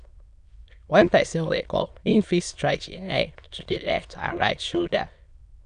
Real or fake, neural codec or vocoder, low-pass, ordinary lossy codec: fake; autoencoder, 22.05 kHz, a latent of 192 numbers a frame, VITS, trained on many speakers; 9.9 kHz; none